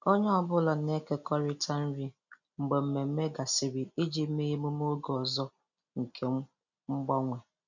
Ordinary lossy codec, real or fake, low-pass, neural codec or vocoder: none; real; 7.2 kHz; none